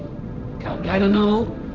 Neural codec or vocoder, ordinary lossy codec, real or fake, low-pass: codec, 16 kHz, 1.1 kbps, Voila-Tokenizer; none; fake; 7.2 kHz